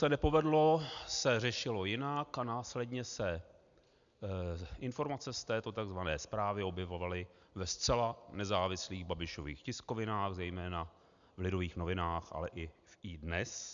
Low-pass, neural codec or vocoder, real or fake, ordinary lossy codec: 7.2 kHz; none; real; MP3, 96 kbps